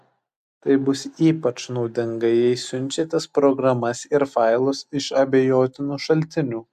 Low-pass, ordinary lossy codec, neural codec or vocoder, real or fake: 14.4 kHz; AAC, 96 kbps; none; real